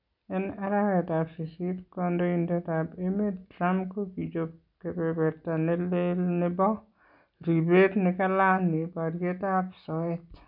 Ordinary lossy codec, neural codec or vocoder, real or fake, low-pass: none; none; real; 5.4 kHz